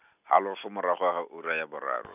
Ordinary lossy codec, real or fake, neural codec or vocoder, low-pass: none; real; none; 3.6 kHz